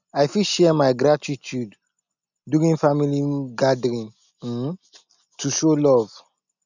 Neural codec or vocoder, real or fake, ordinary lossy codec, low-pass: none; real; none; 7.2 kHz